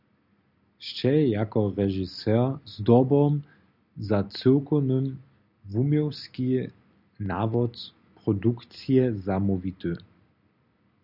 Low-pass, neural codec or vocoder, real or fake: 5.4 kHz; none; real